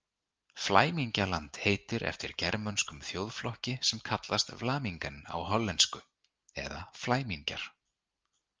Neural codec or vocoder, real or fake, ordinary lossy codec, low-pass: none; real; Opus, 24 kbps; 7.2 kHz